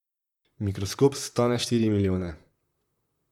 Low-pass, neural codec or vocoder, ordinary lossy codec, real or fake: 19.8 kHz; vocoder, 44.1 kHz, 128 mel bands, Pupu-Vocoder; none; fake